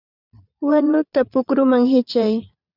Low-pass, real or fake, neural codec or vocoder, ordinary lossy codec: 5.4 kHz; fake; vocoder, 22.05 kHz, 80 mel bands, Vocos; Opus, 64 kbps